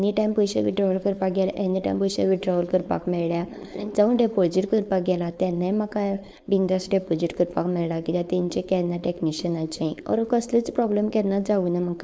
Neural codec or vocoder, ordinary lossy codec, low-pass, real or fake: codec, 16 kHz, 4.8 kbps, FACodec; none; none; fake